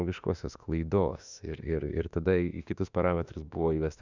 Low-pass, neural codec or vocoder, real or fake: 7.2 kHz; autoencoder, 48 kHz, 32 numbers a frame, DAC-VAE, trained on Japanese speech; fake